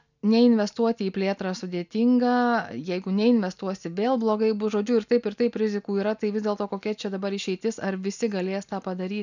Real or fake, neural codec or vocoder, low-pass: real; none; 7.2 kHz